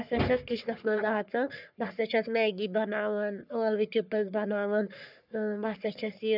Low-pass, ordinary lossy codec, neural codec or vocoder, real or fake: 5.4 kHz; none; codec, 44.1 kHz, 3.4 kbps, Pupu-Codec; fake